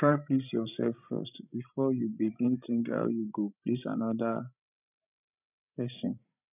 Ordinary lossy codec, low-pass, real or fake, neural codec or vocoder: none; 3.6 kHz; fake; codec, 16 kHz, 16 kbps, FreqCodec, larger model